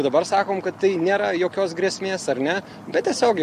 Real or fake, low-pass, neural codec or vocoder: real; 14.4 kHz; none